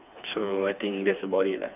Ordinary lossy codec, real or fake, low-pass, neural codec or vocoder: none; fake; 3.6 kHz; codec, 16 kHz, 4 kbps, FreqCodec, smaller model